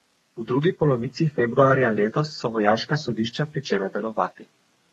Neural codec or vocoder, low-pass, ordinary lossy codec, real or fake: codec, 32 kHz, 1.9 kbps, SNAC; 14.4 kHz; AAC, 32 kbps; fake